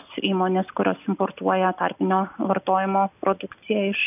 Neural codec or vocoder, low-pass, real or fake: none; 3.6 kHz; real